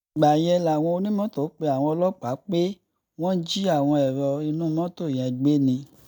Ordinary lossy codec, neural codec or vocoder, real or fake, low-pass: none; none; real; 19.8 kHz